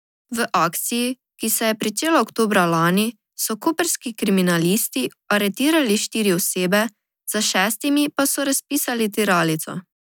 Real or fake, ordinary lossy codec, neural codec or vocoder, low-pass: real; none; none; none